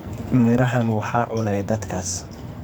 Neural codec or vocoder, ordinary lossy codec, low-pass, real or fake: codec, 44.1 kHz, 2.6 kbps, SNAC; none; none; fake